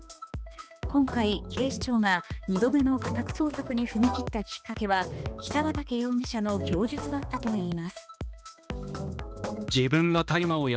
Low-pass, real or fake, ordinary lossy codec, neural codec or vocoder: none; fake; none; codec, 16 kHz, 2 kbps, X-Codec, HuBERT features, trained on balanced general audio